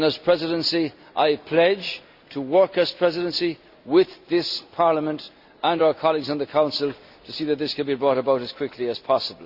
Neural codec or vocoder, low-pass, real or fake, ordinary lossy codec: none; 5.4 kHz; real; Opus, 64 kbps